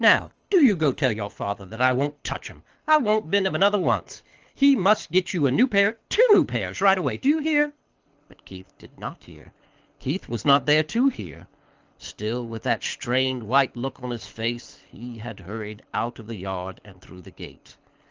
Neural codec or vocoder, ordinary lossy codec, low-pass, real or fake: codec, 24 kHz, 6 kbps, HILCodec; Opus, 24 kbps; 7.2 kHz; fake